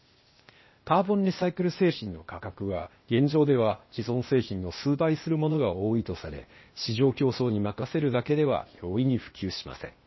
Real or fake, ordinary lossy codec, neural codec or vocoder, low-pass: fake; MP3, 24 kbps; codec, 16 kHz, 0.8 kbps, ZipCodec; 7.2 kHz